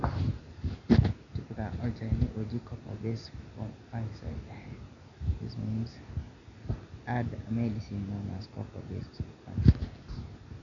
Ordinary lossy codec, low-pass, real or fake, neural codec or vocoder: none; 7.2 kHz; real; none